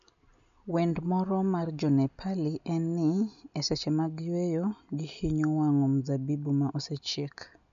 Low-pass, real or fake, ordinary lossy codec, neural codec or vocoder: 7.2 kHz; real; none; none